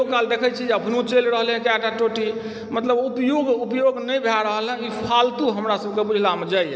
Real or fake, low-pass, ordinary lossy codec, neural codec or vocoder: real; none; none; none